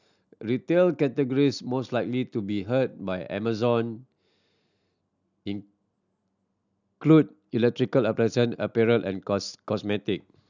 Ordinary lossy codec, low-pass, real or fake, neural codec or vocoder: none; 7.2 kHz; real; none